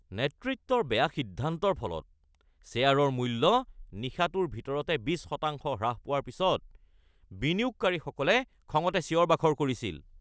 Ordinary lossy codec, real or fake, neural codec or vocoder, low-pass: none; real; none; none